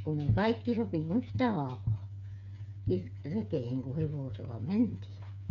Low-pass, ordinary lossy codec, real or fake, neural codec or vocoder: 7.2 kHz; none; fake; codec, 16 kHz, 8 kbps, FreqCodec, smaller model